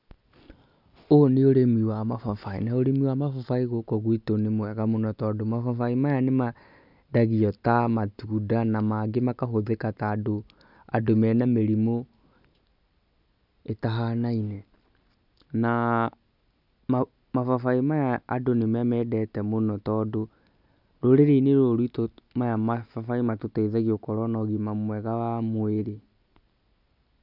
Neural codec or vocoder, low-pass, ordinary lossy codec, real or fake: none; 5.4 kHz; none; real